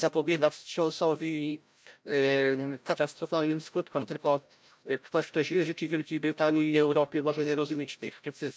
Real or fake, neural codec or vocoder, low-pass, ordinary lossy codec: fake; codec, 16 kHz, 0.5 kbps, FreqCodec, larger model; none; none